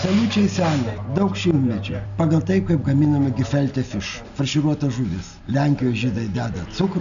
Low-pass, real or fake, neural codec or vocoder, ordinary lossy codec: 7.2 kHz; real; none; AAC, 96 kbps